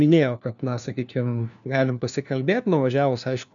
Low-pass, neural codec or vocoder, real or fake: 7.2 kHz; codec, 16 kHz, 1 kbps, FunCodec, trained on LibriTTS, 50 frames a second; fake